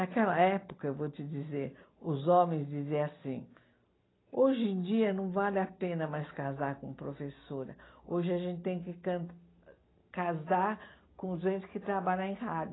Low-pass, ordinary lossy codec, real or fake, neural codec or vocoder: 7.2 kHz; AAC, 16 kbps; real; none